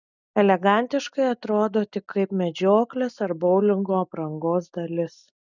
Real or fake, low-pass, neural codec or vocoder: real; 7.2 kHz; none